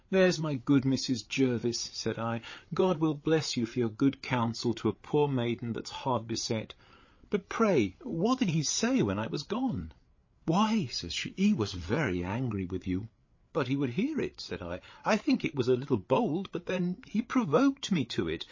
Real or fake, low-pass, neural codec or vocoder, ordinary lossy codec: fake; 7.2 kHz; codec, 16 kHz, 8 kbps, FreqCodec, larger model; MP3, 32 kbps